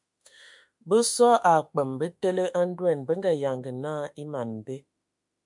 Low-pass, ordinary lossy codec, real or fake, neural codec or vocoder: 10.8 kHz; MP3, 64 kbps; fake; autoencoder, 48 kHz, 32 numbers a frame, DAC-VAE, trained on Japanese speech